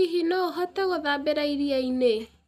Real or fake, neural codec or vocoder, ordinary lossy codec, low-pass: real; none; none; 14.4 kHz